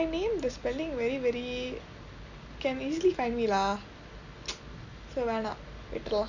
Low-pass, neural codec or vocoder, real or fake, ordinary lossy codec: 7.2 kHz; none; real; none